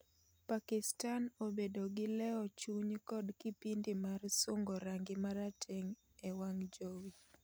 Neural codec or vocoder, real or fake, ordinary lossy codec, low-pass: none; real; none; none